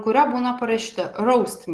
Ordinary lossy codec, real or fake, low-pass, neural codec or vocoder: Opus, 16 kbps; real; 10.8 kHz; none